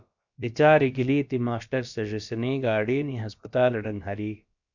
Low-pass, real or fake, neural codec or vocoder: 7.2 kHz; fake; codec, 16 kHz, about 1 kbps, DyCAST, with the encoder's durations